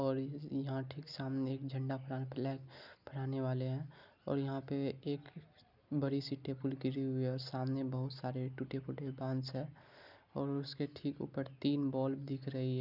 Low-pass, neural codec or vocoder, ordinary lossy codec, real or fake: 5.4 kHz; none; none; real